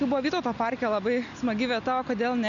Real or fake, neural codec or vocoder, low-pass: real; none; 7.2 kHz